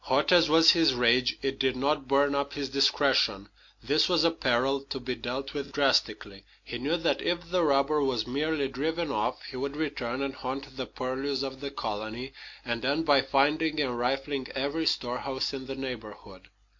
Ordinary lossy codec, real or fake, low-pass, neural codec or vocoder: MP3, 48 kbps; real; 7.2 kHz; none